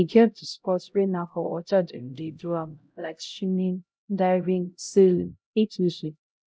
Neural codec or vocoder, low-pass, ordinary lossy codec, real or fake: codec, 16 kHz, 0.5 kbps, X-Codec, HuBERT features, trained on LibriSpeech; none; none; fake